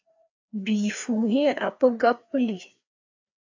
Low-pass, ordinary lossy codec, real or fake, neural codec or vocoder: 7.2 kHz; AAC, 48 kbps; fake; codec, 16 kHz, 2 kbps, FreqCodec, larger model